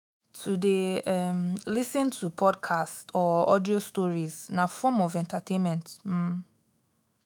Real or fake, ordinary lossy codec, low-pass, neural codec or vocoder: fake; none; none; autoencoder, 48 kHz, 128 numbers a frame, DAC-VAE, trained on Japanese speech